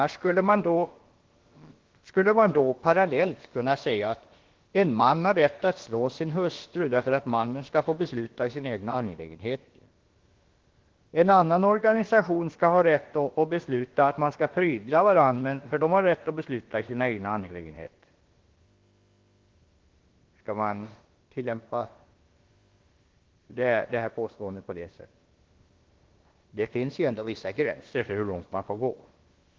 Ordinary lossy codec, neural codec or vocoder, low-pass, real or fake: Opus, 16 kbps; codec, 16 kHz, about 1 kbps, DyCAST, with the encoder's durations; 7.2 kHz; fake